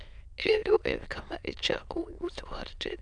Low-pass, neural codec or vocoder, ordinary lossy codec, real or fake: 9.9 kHz; autoencoder, 22.05 kHz, a latent of 192 numbers a frame, VITS, trained on many speakers; none; fake